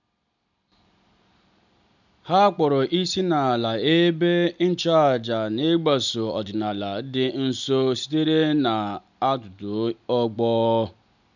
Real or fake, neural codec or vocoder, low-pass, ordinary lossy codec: real; none; 7.2 kHz; none